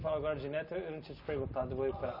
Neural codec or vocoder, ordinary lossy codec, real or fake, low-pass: none; none; real; 5.4 kHz